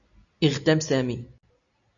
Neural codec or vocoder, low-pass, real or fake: none; 7.2 kHz; real